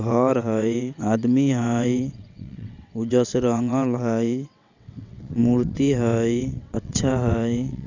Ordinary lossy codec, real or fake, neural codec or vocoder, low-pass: none; fake; vocoder, 22.05 kHz, 80 mel bands, WaveNeXt; 7.2 kHz